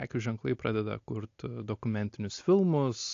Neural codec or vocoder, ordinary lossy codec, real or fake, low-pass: none; AAC, 48 kbps; real; 7.2 kHz